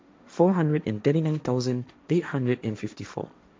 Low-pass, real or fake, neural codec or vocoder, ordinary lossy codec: none; fake; codec, 16 kHz, 1.1 kbps, Voila-Tokenizer; none